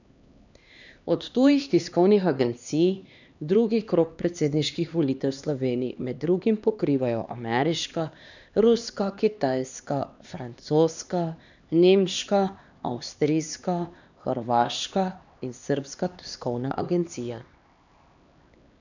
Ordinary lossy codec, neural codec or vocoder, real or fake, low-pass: none; codec, 16 kHz, 2 kbps, X-Codec, HuBERT features, trained on LibriSpeech; fake; 7.2 kHz